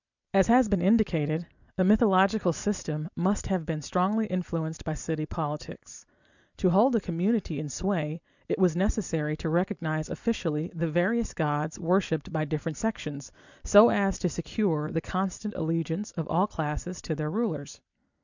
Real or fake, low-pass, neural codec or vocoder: fake; 7.2 kHz; vocoder, 44.1 kHz, 128 mel bands every 256 samples, BigVGAN v2